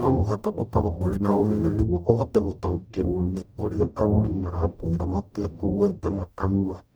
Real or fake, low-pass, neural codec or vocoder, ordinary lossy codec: fake; none; codec, 44.1 kHz, 0.9 kbps, DAC; none